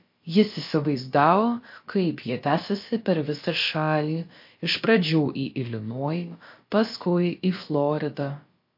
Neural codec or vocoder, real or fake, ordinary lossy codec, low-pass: codec, 16 kHz, about 1 kbps, DyCAST, with the encoder's durations; fake; MP3, 32 kbps; 5.4 kHz